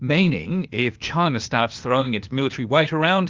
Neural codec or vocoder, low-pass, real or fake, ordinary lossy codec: codec, 16 kHz, 0.8 kbps, ZipCodec; 7.2 kHz; fake; Opus, 32 kbps